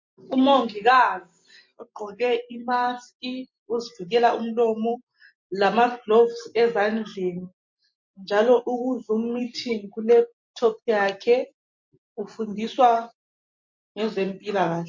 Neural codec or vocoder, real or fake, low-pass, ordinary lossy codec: none; real; 7.2 kHz; MP3, 48 kbps